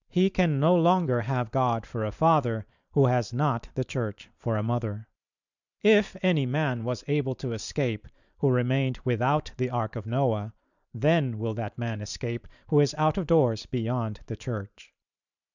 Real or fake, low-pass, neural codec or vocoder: fake; 7.2 kHz; vocoder, 44.1 kHz, 128 mel bands every 512 samples, BigVGAN v2